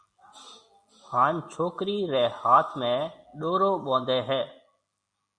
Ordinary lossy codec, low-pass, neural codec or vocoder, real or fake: Opus, 64 kbps; 9.9 kHz; none; real